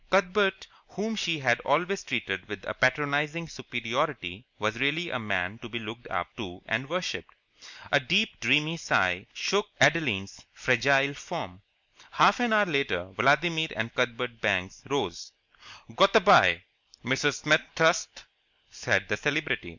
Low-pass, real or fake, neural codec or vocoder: 7.2 kHz; real; none